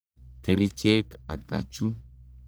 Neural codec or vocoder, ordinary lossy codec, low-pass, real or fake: codec, 44.1 kHz, 1.7 kbps, Pupu-Codec; none; none; fake